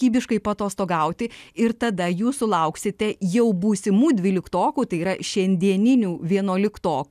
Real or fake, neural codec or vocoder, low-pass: real; none; 14.4 kHz